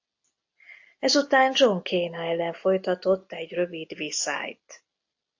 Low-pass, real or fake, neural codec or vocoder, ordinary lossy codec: 7.2 kHz; real; none; AAC, 48 kbps